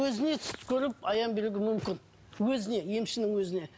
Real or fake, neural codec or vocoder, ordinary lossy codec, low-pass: real; none; none; none